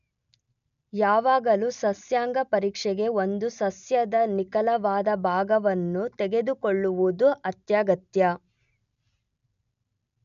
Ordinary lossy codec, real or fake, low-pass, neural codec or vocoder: none; real; 7.2 kHz; none